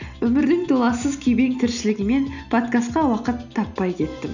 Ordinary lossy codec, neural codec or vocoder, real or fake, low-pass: none; none; real; 7.2 kHz